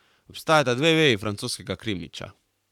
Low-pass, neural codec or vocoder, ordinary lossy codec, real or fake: 19.8 kHz; codec, 44.1 kHz, 7.8 kbps, Pupu-Codec; none; fake